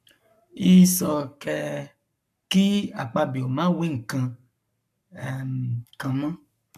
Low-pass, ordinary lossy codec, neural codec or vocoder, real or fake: 14.4 kHz; none; codec, 44.1 kHz, 7.8 kbps, Pupu-Codec; fake